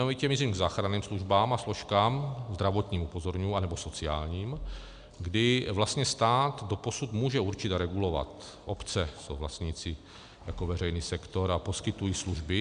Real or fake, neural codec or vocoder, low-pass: real; none; 9.9 kHz